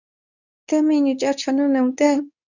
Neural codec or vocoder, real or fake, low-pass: codec, 24 kHz, 0.9 kbps, WavTokenizer, medium speech release version 2; fake; 7.2 kHz